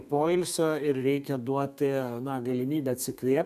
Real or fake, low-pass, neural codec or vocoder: fake; 14.4 kHz; codec, 32 kHz, 1.9 kbps, SNAC